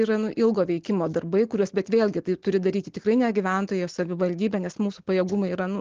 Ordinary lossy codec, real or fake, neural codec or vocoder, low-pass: Opus, 16 kbps; real; none; 7.2 kHz